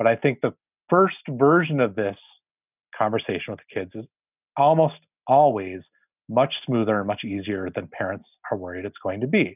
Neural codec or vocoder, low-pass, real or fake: none; 3.6 kHz; real